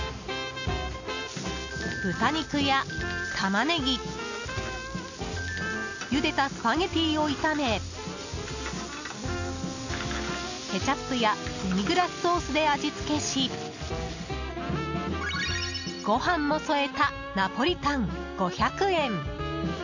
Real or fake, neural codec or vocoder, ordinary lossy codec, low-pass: real; none; none; 7.2 kHz